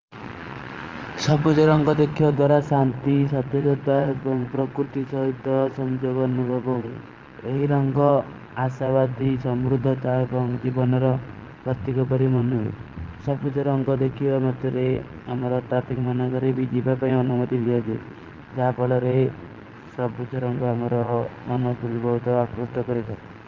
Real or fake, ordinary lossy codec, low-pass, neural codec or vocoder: fake; Opus, 32 kbps; 7.2 kHz; vocoder, 22.05 kHz, 80 mel bands, Vocos